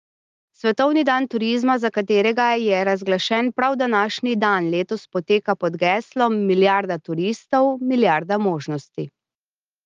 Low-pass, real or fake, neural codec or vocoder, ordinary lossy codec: 7.2 kHz; real; none; Opus, 32 kbps